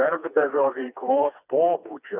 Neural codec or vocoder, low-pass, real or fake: codec, 16 kHz, 2 kbps, FreqCodec, smaller model; 3.6 kHz; fake